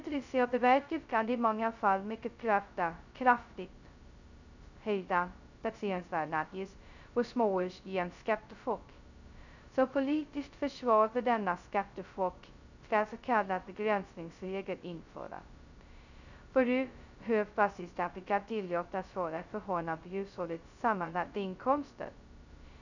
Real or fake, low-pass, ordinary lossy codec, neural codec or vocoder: fake; 7.2 kHz; none; codec, 16 kHz, 0.2 kbps, FocalCodec